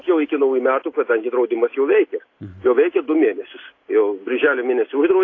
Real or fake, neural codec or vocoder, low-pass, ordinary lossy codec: real; none; 7.2 kHz; AAC, 32 kbps